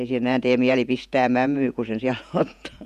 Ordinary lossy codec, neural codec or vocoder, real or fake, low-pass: none; vocoder, 48 kHz, 128 mel bands, Vocos; fake; 14.4 kHz